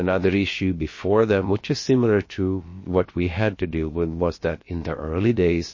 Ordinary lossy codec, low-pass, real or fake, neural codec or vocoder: MP3, 32 kbps; 7.2 kHz; fake; codec, 16 kHz, about 1 kbps, DyCAST, with the encoder's durations